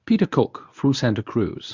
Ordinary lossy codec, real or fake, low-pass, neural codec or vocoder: Opus, 64 kbps; fake; 7.2 kHz; codec, 24 kHz, 0.9 kbps, WavTokenizer, medium speech release version 1